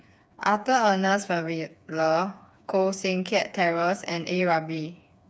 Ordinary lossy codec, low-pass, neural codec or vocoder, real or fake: none; none; codec, 16 kHz, 4 kbps, FreqCodec, smaller model; fake